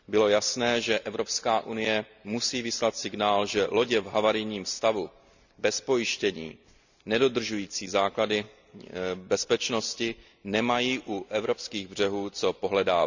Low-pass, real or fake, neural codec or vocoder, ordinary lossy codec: 7.2 kHz; real; none; none